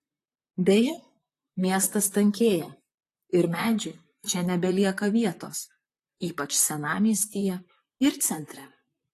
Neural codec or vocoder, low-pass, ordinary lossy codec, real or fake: vocoder, 44.1 kHz, 128 mel bands, Pupu-Vocoder; 14.4 kHz; AAC, 48 kbps; fake